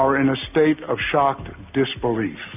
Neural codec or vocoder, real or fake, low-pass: none; real; 3.6 kHz